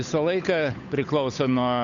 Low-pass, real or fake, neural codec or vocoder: 7.2 kHz; fake; codec, 16 kHz, 8 kbps, FunCodec, trained on Chinese and English, 25 frames a second